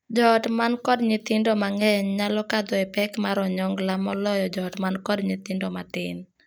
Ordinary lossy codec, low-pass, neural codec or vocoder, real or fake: none; none; none; real